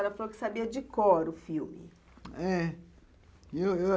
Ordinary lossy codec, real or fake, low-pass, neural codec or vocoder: none; real; none; none